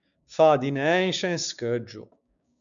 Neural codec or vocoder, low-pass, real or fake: codec, 16 kHz, 6 kbps, DAC; 7.2 kHz; fake